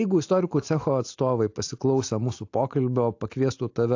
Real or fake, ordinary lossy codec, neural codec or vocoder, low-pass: real; AAC, 48 kbps; none; 7.2 kHz